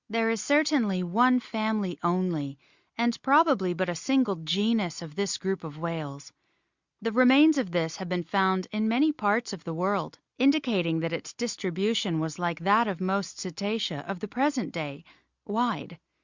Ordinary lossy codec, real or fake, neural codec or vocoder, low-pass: Opus, 64 kbps; real; none; 7.2 kHz